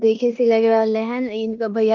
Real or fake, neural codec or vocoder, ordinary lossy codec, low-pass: fake; codec, 16 kHz in and 24 kHz out, 0.9 kbps, LongCat-Audio-Codec, four codebook decoder; Opus, 32 kbps; 7.2 kHz